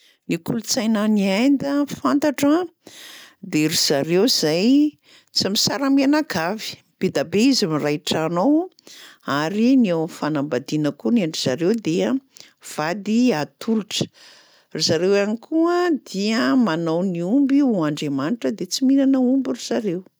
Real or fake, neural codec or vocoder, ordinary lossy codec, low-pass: real; none; none; none